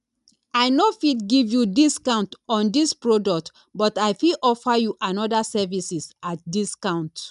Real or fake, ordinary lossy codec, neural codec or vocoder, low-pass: real; none; none; 10.8 kHz